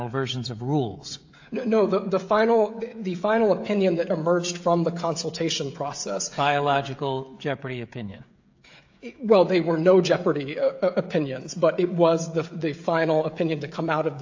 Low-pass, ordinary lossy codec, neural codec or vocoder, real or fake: 7.2 kHz; AAC, 48 kbps; codec, 16 kHz, 16 kbps, FreqCodec, smaller model; fake